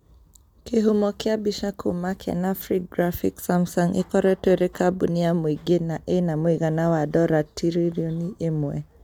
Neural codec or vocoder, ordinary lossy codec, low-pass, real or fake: none; none; 19.8 kHz; real